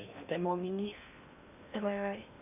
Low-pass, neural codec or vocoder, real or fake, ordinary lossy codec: 3.6 kHz; codec, 16 kHz in and 24 kHz out, 0.8 kbps, FocalCodec, streaming, 65536 codes; fake; none